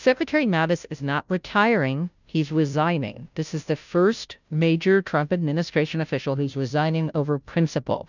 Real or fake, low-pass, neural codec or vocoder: fake; 7.2 kHz; codec, 16 kHz, 0.5 kbps, FunCodec, trained on Chinese and English, 25 frames a second